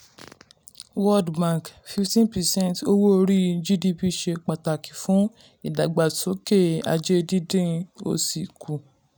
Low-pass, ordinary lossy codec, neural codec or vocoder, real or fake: none; none; none; real